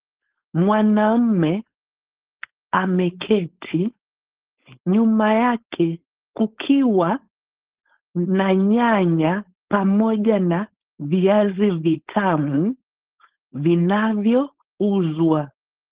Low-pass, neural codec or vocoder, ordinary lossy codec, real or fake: 3.6 kHz; codec, 16 kHz, 4.8 kbps, FACodec; Opus, 16 kbps; fake